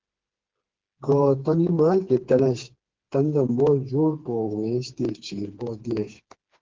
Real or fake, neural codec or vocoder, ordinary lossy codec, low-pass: fake; codec, 16 kHz, 4 kbps, FreqCodec, smaller model; Opus, 16 kbps; 7.2 kHz